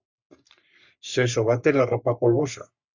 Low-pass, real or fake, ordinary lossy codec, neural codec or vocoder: 7.2 kHz; fake; Opus, 64 kbps; codec, 44.1 kHz, 3.4 kbps, Pupu-Codec